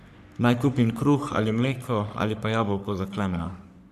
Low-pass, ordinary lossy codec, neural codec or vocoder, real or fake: 14.4 kHz; none; codec, 44.1 kHz, 3.4 kbps, Pupu-Codec; fake